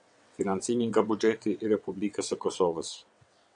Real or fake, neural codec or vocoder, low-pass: fake; vocoder, 22.05 kHz, 80 mel bands, WaveNeXt; 9.9 kHz